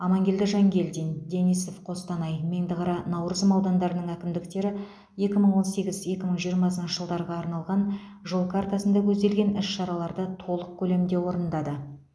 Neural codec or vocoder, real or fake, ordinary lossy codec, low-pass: none; real; none; none